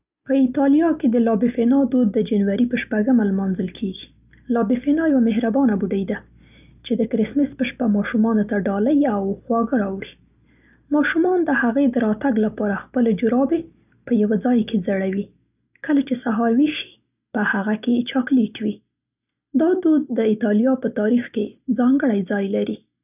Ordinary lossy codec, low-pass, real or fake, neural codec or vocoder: none; 3.6 kHz; real; none